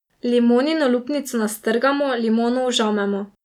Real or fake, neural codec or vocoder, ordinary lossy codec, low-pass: real; none; none; 19.8 kHz